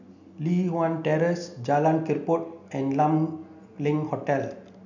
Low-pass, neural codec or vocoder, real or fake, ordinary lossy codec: 7.2 kHz; none; real; none